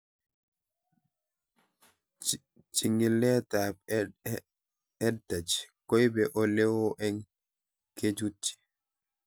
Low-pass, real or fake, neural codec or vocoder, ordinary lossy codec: none; real; none; none